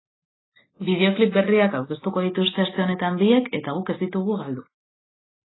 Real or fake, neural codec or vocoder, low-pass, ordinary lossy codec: real; none; 7.2 kHz; AAC, 16 kbps